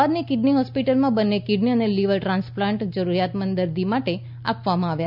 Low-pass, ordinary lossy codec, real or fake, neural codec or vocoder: 5.4 kHz; none; real; none